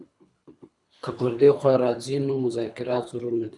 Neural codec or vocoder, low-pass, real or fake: codec, 24 kHz, 3 kbps, HILCodec; 10.8 kHz; fake